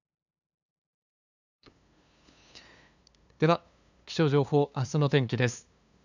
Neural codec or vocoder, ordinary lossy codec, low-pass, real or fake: codec, 16 kHz, 2 kbps, FunCodec, trained on LibriTTS, 25 frames a second; none; 7.2 kHz; fake